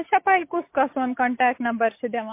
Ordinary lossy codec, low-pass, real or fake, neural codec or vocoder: MP3, 24 kbps; 3.6 kHz; real; none